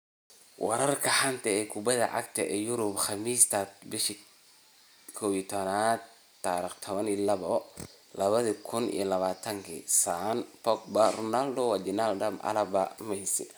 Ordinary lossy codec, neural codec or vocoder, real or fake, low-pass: none; none; real; none